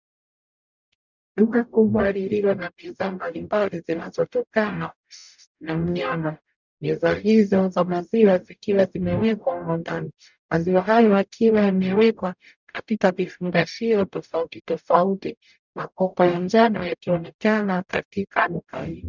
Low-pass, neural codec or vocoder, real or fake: 7.2 kHz; codec, 44.1 kHz, 0.9 kbps, DAC; fake